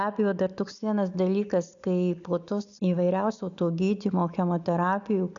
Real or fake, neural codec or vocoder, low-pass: real; none; 7.2 kHz